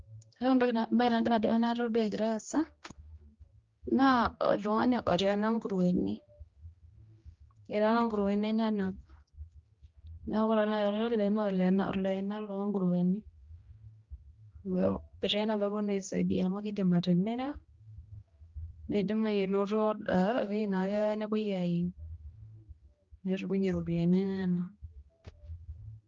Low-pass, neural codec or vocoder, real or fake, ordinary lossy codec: 7.2 kHz; codec, 16 kHz, 1 kbps, X-Codec, HuBERT features, trained on general audio; fake; Opus, 32 kbps